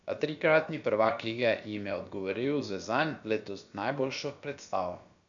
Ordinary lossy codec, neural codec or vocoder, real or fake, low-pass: MP3, 96 kbps; codec, 16 kHz, about 1 kbps, DyCAST, with the encoder's durations; fake; 7.2 kHz